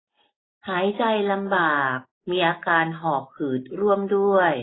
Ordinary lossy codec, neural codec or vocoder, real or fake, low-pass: AAC, 16 kbps; none; real; 7.2 kHz